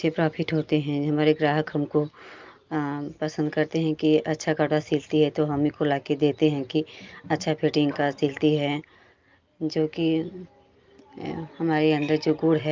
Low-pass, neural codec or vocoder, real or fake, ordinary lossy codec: 7.2 kHz; none; real; Opus, 24 kbps